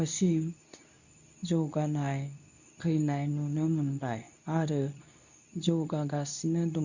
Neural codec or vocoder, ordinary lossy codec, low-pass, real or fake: codec, 24 kHz, 0.9 kbps, WavTokenizer, medium speech release version 2; none; 7.2 kHz; fake